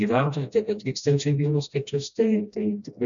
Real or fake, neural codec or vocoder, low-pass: fake; codec, 16 kHz, 1 kbps, FreqCodec, smaller model; 7.2 kHz